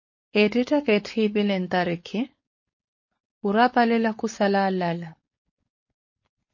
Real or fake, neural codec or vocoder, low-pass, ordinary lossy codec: fake; codec, 16 kHz, 4.8 kbps, FACodec; 7.2 kHz; MP3, 32 kbps